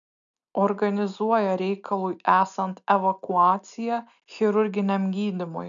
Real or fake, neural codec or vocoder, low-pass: real; none; 7.2 kHz